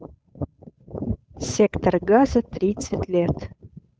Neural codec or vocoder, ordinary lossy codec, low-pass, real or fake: codec, 16 kHz, 8 kbps, FunCodec, trained on Chinese and English, 25 frames a second; none; none; fake